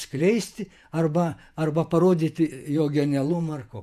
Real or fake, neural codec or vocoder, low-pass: fake; vocoder, 48 kHz, 128 mel bands, Vocos; 14.4 kHz